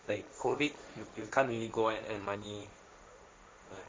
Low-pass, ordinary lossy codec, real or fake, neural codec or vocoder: none; none; fake; codec, 16 kHz, 1.1 kbps, Voila-Tokenizer